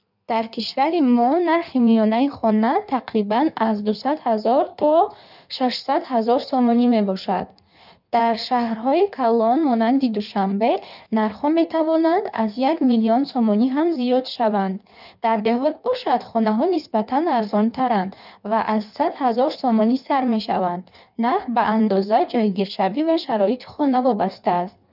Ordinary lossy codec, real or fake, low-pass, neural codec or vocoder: none; fake; 5.4 kHz; codec, 16 kHz in and 24 kHz out, 1.1 kbps, FireRedTTS-2 codec